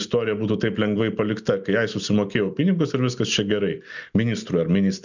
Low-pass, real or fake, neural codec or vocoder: 7.2 kHz; real; none